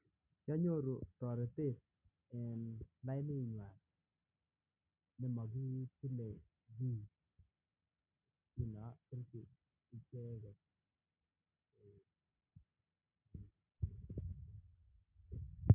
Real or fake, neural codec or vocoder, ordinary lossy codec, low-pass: real; none; none; 3.6 kHz